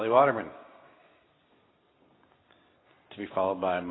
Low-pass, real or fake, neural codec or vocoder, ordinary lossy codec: 7.2 kHz; real; none; AAC, 16 kbps